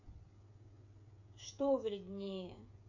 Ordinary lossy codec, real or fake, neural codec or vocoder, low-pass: AAC, 48 kbps; fake; codec, 16 kHz, 8 kbps, FreqCodec, smaller model; 7.2 kHz